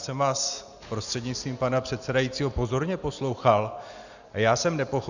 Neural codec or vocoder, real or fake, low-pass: none; real; 7.2 kHz